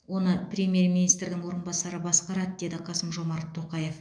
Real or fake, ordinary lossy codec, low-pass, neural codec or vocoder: fake; none; 9.9 kHz; autoencoder, 48 kHz, 128 numbers a frame, DAC-VAE, trained on Japanese speech